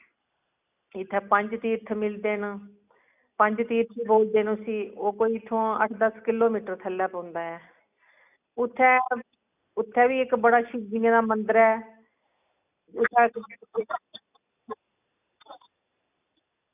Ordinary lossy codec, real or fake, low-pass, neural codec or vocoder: none; real; 3.6 kHz; none